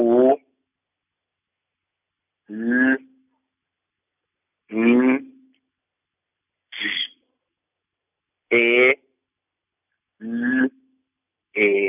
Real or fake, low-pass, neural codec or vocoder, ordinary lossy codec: real; 3.6 kHz; none; none